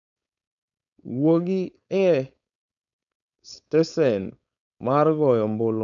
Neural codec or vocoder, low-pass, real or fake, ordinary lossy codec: codec, 16 kHz, 4.8 kbps, FACodec; 7.2 kHz; fake; none